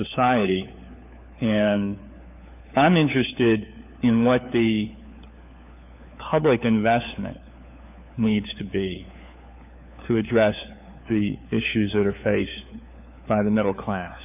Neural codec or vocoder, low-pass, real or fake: codec, 16 kHz, 4 kbps, FunCodec, trained on LibriTTS, 50 frames a second; 3.6 kHz; fake